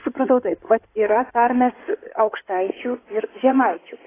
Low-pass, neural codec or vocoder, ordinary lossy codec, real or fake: 3.6 kHz; codec, 16 kHz in and 24 kHz out, 2.2 kbps, FireRedTTS-2 codec; AAC, 16 kbps; fake